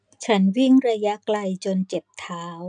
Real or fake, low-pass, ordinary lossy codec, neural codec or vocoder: real; 9.9 kHz; none; none